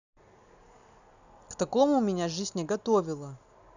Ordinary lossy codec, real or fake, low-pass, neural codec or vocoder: none; real; 7.2 kHz; none